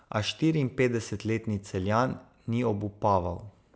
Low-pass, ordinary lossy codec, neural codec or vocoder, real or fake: none; none; none; real